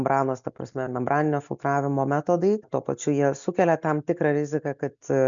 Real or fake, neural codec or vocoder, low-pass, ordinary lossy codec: real; none; 7.2 kHz; MP3, 96 kbps